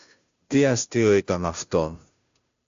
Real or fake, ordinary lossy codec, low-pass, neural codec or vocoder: fake; AAC, 64 kbps; 7.2 kHz; codec, 16 kHz, 0.5 kbps, FunCodec, trained on Chinese and English, 25 frames a second